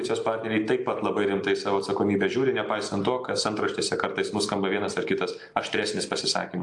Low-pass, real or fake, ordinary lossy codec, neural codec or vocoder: 10.8 kHz; real; AAC, 64 kbps; none